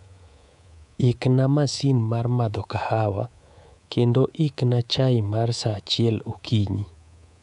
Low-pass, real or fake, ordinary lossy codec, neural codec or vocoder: 10.8 kHz; fake; none; codec, 24 kHz, 3.1 kbps, DualCodec